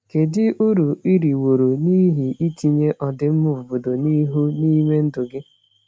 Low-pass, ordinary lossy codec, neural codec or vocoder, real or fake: none; none; none; real